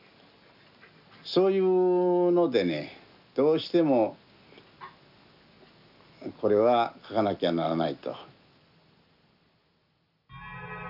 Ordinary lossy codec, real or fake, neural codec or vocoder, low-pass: none; real; none; 5.4 kHz